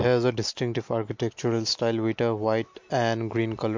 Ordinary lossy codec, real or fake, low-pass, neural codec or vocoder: MP3, 48 kbps; real; 7.2 kHz; none